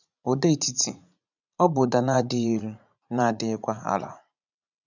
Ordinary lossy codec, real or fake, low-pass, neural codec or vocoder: none; fake; 7.2 kHz; vocoder, 44.1 kHz, 80 mel bands, Vocos